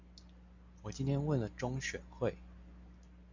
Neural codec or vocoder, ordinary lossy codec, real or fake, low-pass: none; MP3, 64 kbps; real; 7.2 kHz